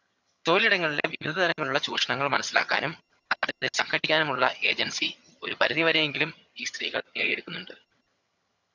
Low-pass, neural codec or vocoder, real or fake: 7.2 kHz; vocoder, 22.05 kHz, 80 mel bands, HiFi-GAN; fake